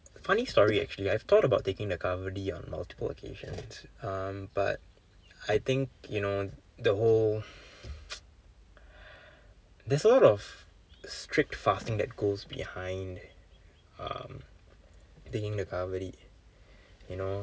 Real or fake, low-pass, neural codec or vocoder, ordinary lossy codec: real; none; none; none